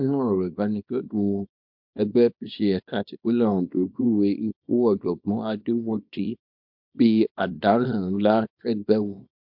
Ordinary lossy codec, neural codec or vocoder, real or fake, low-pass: MP3, 48 kbps; codec, 24 kHz, 0.9 kbps, WavTokenizer, small release; fake; 5.4 kHz